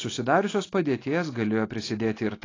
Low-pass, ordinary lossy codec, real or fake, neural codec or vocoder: 7.2 kHz; AAC, 32 kbps; real; none